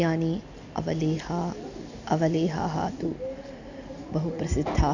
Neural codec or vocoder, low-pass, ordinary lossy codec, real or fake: none; 7.2 kHz; none; real